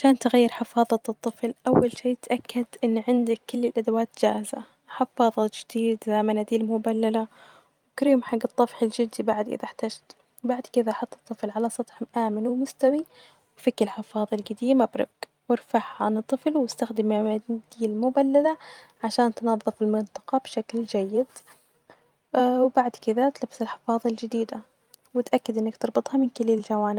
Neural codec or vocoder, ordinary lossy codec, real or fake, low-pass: vocoder, 44.1 kHz, 128 mel bands every 512 samples, BigVGAN v2; Opus, 32 kbps; fake; 19.8 kHz